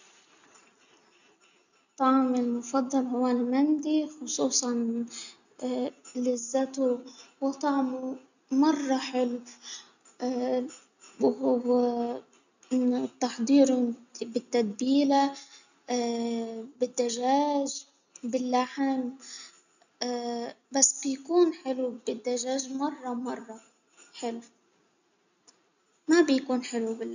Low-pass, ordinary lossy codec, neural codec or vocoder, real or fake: 7.2 kHz; none; none; real